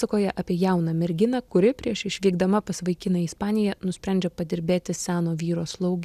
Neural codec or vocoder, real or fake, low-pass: none; real; 14.4 kHz